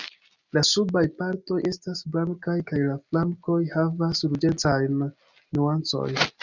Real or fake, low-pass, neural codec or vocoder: real; 7.2 kHz; none